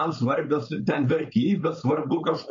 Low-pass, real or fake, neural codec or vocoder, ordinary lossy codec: 7.2 kHz; fake; codec, 16 kHz, 4.8 kbps, FACodec; MP3, 48 kbps